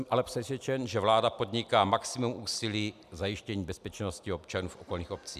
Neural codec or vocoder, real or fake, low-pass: none; real; 14.4 kHz